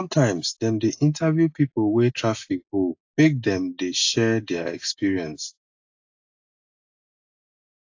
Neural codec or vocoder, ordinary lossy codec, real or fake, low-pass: none; AAC, 48 kbps; real; 7.2 kHz